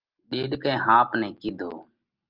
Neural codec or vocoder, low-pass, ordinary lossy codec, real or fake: none; 5.4 kHz; Opus, 24 kbps; real